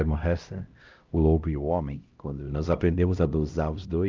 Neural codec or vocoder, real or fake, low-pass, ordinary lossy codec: codec, 16 kHz, 0.5 kbps, X-Codec, HuBERT features, trained on LibriSpeech; fake; 7.2 kHz; Opus, 24 kbps